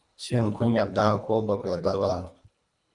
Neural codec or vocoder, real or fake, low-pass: codec, 24 kHz, 1.5 kbps, HILCodec; fake; 10.8 kHz